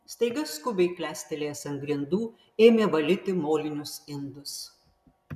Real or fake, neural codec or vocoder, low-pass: real; none; 14.4 kHz